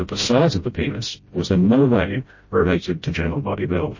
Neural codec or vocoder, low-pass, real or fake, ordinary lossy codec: codec, 16 kHz, 0.5 kbps, FreqCodec, smaller model; 7.2 kHz; fake; MP3, 32 kbps